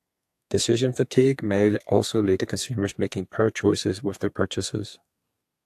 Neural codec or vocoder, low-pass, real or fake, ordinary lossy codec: codec, 32 kHz, 1.9 kbps, SNAC; 14.4 kHz; fake; AAC, 64 kbps